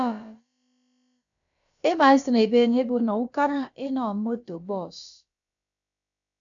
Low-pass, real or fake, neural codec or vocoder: 7.2 kHz; fake; codec, 16 kHz, about 1 kbps, DyCAST, with the encoder's durations